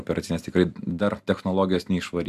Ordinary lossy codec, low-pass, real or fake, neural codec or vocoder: AAC, 96 kbps; 14.4 kHz; real; none